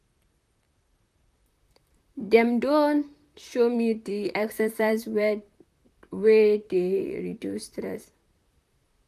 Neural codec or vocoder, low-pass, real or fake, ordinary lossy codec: vocoder, 44.1 kHz, 128 mel bands, Pupu-Vocoder; 14.4 kHz; fake; none